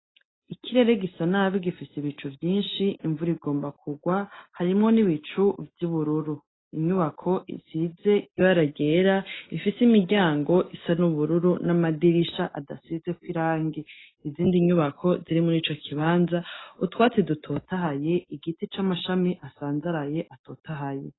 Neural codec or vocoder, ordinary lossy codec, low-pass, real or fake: none; AAC, 16 kbps; 7.2 kHz; real